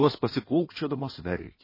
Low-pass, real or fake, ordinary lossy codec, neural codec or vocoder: 5.4 kHz; fake; MP3, 24 kbps; codec, 16 kHz in and 24 kHz out, 1.1 kbps, FireRedTTS-2 codec